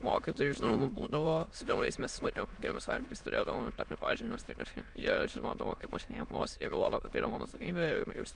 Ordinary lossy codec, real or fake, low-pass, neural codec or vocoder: MP3, 64 kbps; fake; 9.9 kHz; autoencoder, 22.05 kHz, a latent of 192 numbers a frame, VITS, trained on many speakers